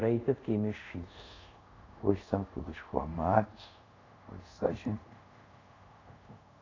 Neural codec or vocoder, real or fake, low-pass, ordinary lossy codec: codec, 24 kHz, 0.5 kbps, DualCodec; fake; 7.2 kHz; AAC, 32 kbps